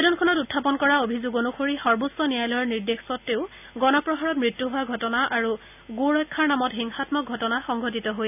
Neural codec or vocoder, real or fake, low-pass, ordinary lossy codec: none; real; 3.6 kHz; none